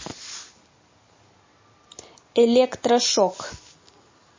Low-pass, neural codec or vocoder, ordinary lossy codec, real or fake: 7.2 kHz; none; MP3, 32 kbps; real